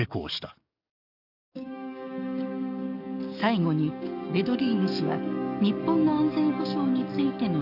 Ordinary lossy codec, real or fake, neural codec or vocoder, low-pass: none; fake; codec, 44.1 kHz, 7.8 kbps, DAC; 5.4 kHz